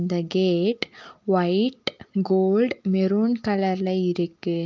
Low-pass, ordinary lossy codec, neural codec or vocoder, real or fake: 7.2 kHz; Opus, 24 kbps; none; real